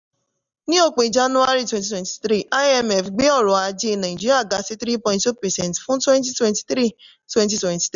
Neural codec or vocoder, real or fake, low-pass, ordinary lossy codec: none; real; 7.2 kHz; none